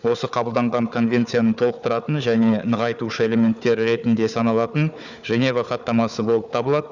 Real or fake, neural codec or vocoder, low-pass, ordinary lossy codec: fake; codec, 16 kHz, 4 kbps, FunCodec, trained on Chinese and English, 50 frames a second; 7.2 kHz; none